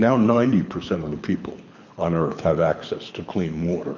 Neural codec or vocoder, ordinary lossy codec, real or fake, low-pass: codec, 24 kHz, 6 kbps, HILCodec; MP3, 48 kbps; fake; 7.2 kHz